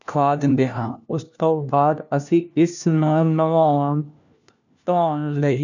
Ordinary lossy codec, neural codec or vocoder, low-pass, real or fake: none; codec, 16 kHz, 1 kbps, FunCodec, trained on LibriTTS, 50 frames a second; 7.2 kHz; fake